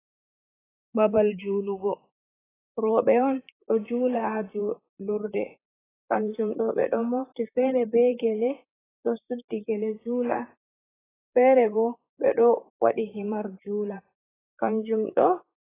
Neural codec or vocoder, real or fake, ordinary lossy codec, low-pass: vocoder, 44.1 kHz, 128 mel bands, Pupu-Vocoder; fake; AAC, 16 kbps; 3.6 kHz